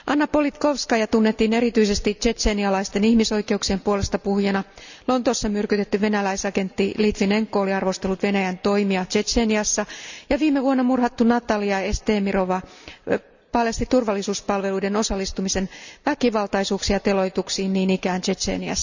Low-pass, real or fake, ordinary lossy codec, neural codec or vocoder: 7.2 kHz; real; none; none